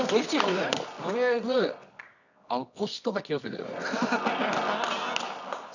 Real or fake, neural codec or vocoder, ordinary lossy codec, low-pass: fake; codec, 24 kHz, 0.9 kbps, WavTokenizer, medium music audio release; none; 7.2 kHz